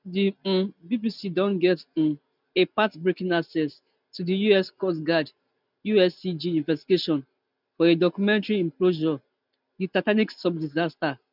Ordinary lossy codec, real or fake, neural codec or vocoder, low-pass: none; real; none; 5.4 kHz